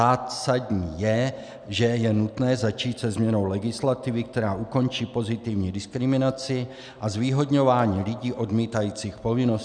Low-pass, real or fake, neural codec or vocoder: 9.9 kHz; real; none